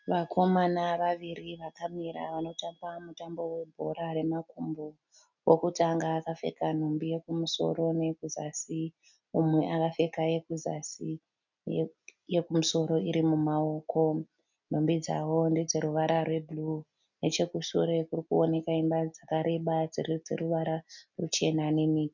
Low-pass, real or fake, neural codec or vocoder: 7.2 kHz; real; none